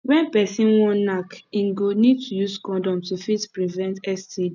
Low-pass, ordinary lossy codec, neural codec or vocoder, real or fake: 7.2 kHz; none; none; real